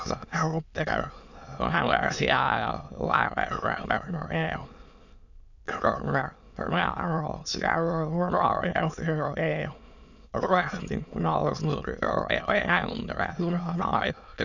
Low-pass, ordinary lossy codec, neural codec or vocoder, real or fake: 7.2 kHz; none; autoencoder, 22.05 kHz, a latent of 192 numbers a frame, VITS, trained on many speakers; fake